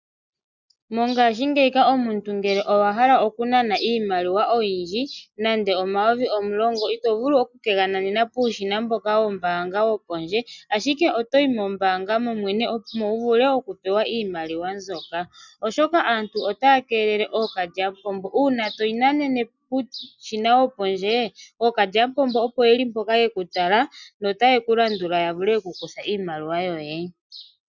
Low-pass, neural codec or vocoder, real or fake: 7.2 kHz; none; real